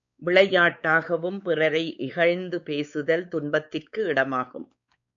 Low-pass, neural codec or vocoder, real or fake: 7.2 kHz; codec, 16 kHz, 4 kbps, X-Codec, WavLM features, trained on Multilingual LibriSpeech; fake